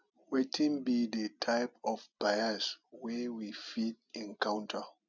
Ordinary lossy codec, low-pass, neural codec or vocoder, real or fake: none; 7.2 kHz; none; real